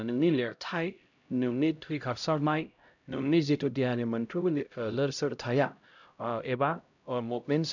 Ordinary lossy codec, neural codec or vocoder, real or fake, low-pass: none; codec, 16 kHz, 0.5 kbps, X-Codec, HuBERT features, trained on LibriSpeech; fake; 7.2 kHz